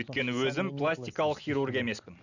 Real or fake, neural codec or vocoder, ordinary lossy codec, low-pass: real; none; none; 7.2 kHz